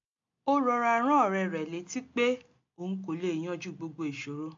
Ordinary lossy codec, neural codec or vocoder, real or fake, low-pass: AAC, 48 kbps; none; real; 7.2 kHz